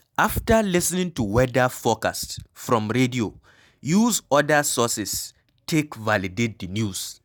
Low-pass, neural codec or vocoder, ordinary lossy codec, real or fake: none; none; none; real